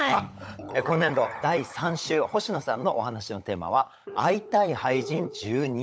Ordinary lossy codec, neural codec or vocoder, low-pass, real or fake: none; codec, 16 kHz, 16 kbps, FunCodec, trained on LibriTTS, 50 frames a second; none; fake